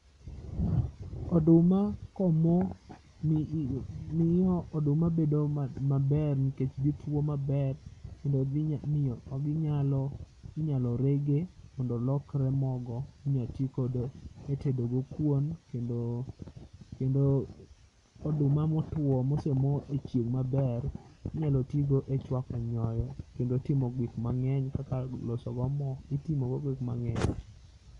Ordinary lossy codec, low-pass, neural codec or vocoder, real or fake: none; 10.8 kHz; none; real